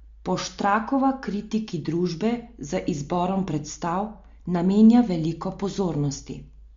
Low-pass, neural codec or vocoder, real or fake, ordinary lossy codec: 7.2 kHz; none; real; MP3, 48 kbps